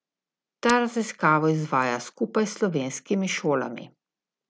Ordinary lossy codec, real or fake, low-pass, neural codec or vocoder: none; real; none; none